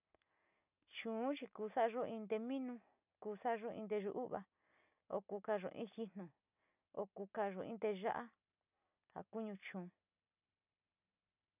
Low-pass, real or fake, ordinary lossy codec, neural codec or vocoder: 3.6 kHz; real; none; none